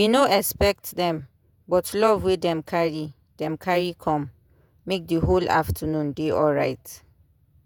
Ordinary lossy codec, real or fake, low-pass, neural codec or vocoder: none; fake; none; vocoder, 48 kHz, 128 mel bands, Vocos